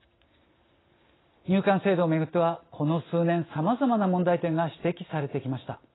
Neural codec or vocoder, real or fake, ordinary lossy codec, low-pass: none; real; AAC, 16 kbps; 7.2 kHz